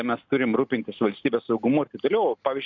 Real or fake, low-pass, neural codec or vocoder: real; 7.2 kHz; none